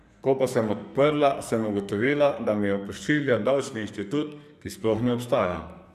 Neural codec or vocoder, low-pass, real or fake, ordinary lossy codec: codec, 44.1 kHz, 2.6 kbps, SNAC; 14.4 kHz; fake; none